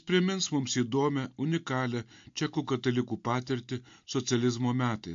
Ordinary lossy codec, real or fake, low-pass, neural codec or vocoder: MP3, 48 kbps; real; 7.2 kHz; none